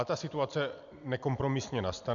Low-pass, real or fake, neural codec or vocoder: 7.2 kHz; real; none